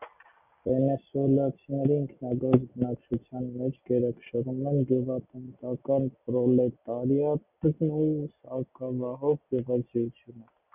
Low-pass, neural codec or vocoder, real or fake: 3.6 kHz; none; real